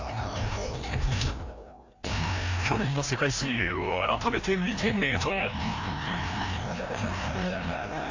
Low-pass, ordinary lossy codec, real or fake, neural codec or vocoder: 7.2 kHz; AAC, 48 kbps; fake; codec, 16 kHz, 1 kbps, FreqCodec, larger model